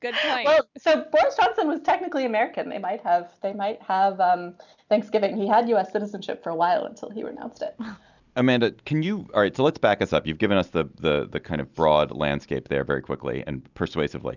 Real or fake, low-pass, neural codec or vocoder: real; 7.2 kHz; none